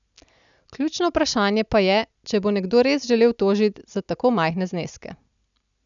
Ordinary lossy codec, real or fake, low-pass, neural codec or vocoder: none; real; 7.2 kHz; none